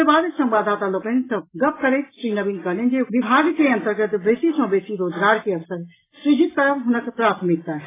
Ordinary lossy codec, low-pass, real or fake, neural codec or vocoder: AAC, 16 kbps; 3.6 kHz; real; none